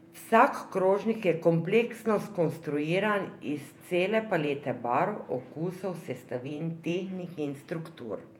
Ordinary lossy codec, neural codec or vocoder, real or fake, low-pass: MP3, 96 kbps; vocoder, 48 kHz, 128 mel bands, Vocos; fake; 19.8 kHz